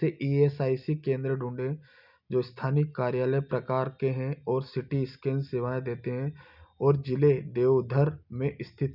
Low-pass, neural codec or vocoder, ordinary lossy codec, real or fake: 5.4 kHz; none; none; real